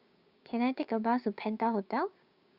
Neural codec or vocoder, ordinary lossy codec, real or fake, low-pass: codec, 16 kHz, 6 kbps, DAC; Opus, 64 kbps; fake; 5.4 kHz